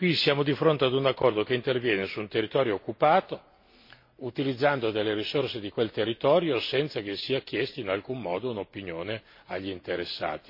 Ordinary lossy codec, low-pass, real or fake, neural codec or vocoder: MP3, 32 kbps; 5.4 kHz; real; none